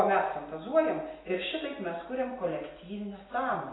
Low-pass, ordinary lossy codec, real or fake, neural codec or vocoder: 7.2 kHz; AAC, 16 kbps; real; none